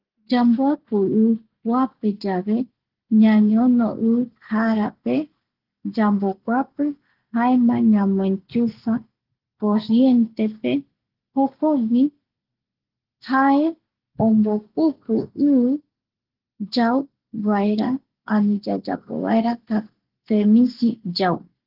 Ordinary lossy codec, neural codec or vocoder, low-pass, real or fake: Opus, 16 kbps; none; 5.4 kHz; real